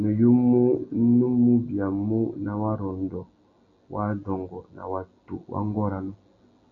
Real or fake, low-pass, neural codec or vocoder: real; 7.2 kHz; none